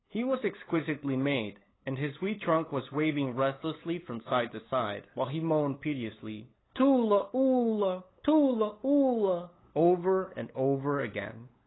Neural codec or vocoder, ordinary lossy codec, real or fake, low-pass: codec, 16 kHz, 8 kbps, FunCodec, trained on LibriTTS, 25 frames a second; AAC, 16 kbps; fake; 7.2 kHz